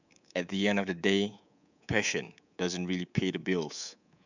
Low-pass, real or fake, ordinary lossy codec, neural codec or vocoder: 7.2 kHz; fake; none; codec, 24 kHz, 3.1 kbps, DualCodec